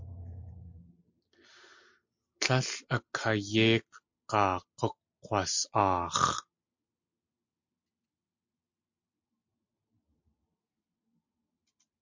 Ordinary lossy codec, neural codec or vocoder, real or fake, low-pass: MP3, 48 kbps; none; real; 7.2 kHz